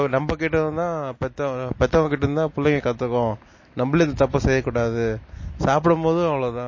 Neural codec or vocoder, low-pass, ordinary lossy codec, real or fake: none; 7.2 kHz; MP3, 32 kbps; real